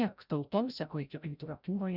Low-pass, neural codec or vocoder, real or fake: 5.4 kHz; codec, 16 kHz, 0.5 kbps, FreqCodec, larger model; fake